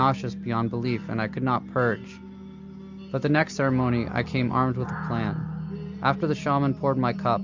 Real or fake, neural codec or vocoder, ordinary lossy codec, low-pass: real; none; MP3, 64 kbps; 7.2 kHz